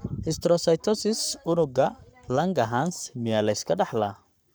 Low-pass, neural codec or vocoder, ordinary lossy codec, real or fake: none; codec, 44.1 kHz, 7.8 kbps, Pupu-Codec; none; fake